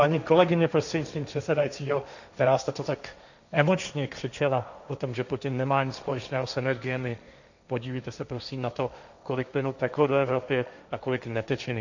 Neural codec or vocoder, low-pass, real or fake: codec, 16 kHz, 1.1 kbps, Voila-Tokenizer; 7.2 kHz; fake